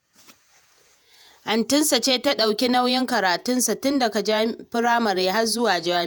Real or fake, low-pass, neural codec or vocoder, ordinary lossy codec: fake; none; vocoder, 48 kHz, 128 mel bands, Vocos; none